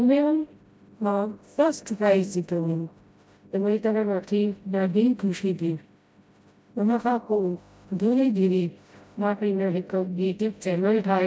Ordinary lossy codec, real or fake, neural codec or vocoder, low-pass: none; fake; codec, 16 kHz, 0.5 kbps, FreqCodec, smaller model; none